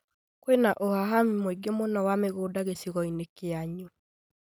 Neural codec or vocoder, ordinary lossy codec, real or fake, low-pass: none; none; real; none